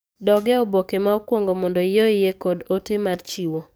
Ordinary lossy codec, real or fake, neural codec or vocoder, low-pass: none; fake; codec, 44.1 kHz, 7.8 kbps, DAC; none